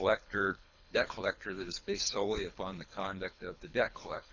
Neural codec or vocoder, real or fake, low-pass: codec, 24 kHz, 3 kbps, HILCodec; fake; 7.2 kHz